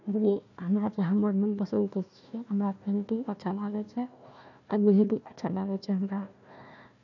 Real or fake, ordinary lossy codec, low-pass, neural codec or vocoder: fake; none; 7.2 kHz; codec, 16 kHz, 1 kbps, FunCodec, trained on Chinese and English, 50 frames a second